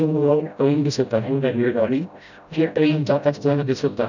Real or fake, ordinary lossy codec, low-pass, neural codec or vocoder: fake; none; 7.2 kHz; codec, 16 kHz, 0.5 kbps, FreqCodec, smaller model